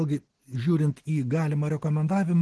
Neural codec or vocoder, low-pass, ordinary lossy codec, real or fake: none; 10.8 kHz; Opus, 16 kbps; real